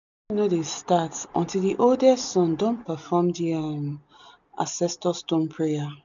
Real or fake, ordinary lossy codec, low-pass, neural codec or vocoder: real; none; 7.2 kHz; none